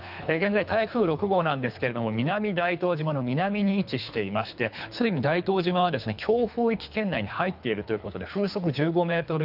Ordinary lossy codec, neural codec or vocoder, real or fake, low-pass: none; codec, 24 kHz, 3 kbps, HILCodec; fake; 5.4 kHz